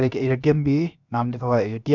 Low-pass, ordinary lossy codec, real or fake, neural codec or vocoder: 7.2 kHz; none; fake; codec, 16 kHz, 0.7 kbps, FocalCodec